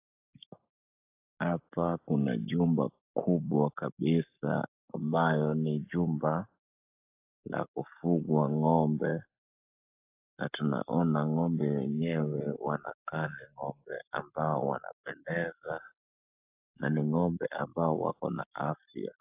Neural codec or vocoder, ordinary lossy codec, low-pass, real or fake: codec, 44.1 kHz, 7.8 kbps, Pupu-Codec; AAC, 32 kbps; 3.6 kHz; fake